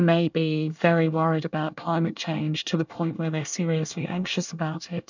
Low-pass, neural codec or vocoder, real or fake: 7.2 kHz; codec, 24 kHz, 1 kbps, SNAC; fake